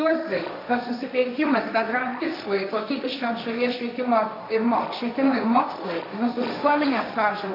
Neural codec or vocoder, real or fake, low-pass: codec, 16 kHz, 1.1 kbps, Voila-Tokenizer; fake; 5.4 kHz